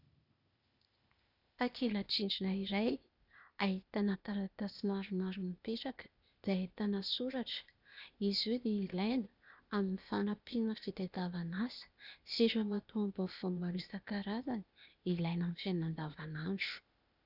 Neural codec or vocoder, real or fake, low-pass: codec, 16 kHz, 0.8 kbps, ZipCodec; fake; 5.4 kHz